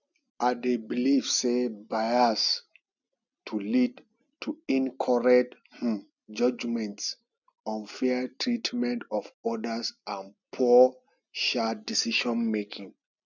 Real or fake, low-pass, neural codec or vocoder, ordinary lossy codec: real; 7.2 kHz; none; none